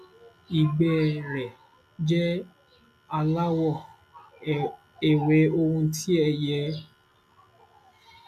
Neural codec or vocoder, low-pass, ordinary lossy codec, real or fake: none; 14.4 kHz; none; real